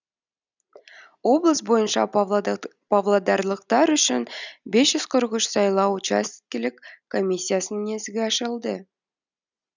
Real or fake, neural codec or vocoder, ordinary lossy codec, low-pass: real; none; none; 7.2 kHz